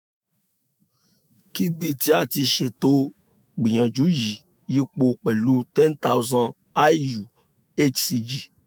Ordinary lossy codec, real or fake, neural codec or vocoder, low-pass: none; fake; autoencoder, 48 kHz, 128 numbers a frame, DAC-VAE, trained on Japanese speech; none